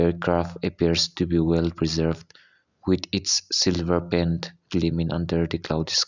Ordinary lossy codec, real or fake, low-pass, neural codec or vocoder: none; real; 7.2 kHz; none